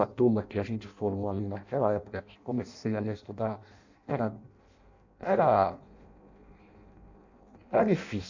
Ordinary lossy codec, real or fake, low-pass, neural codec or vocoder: AAC, 48 kbps; fake; 7.2 kHz; codec, 16 kHz in and 24 kHz out, 0.6 kbps, FireRedTTS-2 codec